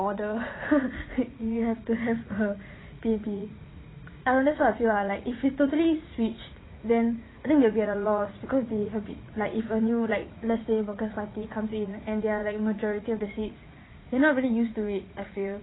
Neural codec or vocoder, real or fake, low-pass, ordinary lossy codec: vocoder, 44.1 kHz, 80 mel bands, Vocos; fake; 7.2 kHz; AAC, 16 kbps